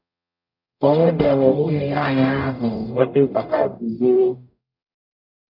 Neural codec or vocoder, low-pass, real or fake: codec, 44.1 kHz, 0.9 kbps, DAC; 5.4 kHz; fake